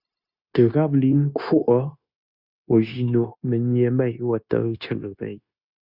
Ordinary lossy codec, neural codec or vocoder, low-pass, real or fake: Opus, 64 kbps; codec, 16 kHz, 0.9 kbps, LongCat-Audio-Codec; 5.4 kHz; fake